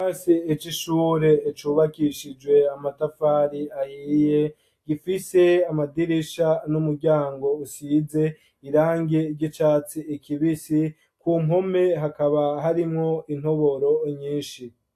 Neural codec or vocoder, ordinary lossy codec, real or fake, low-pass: none; AAC, 64 kbps; real; 14.4 kHz